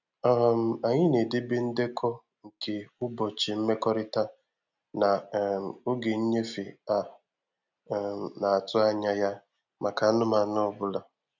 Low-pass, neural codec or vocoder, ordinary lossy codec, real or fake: 7.2 kHz; none; none; real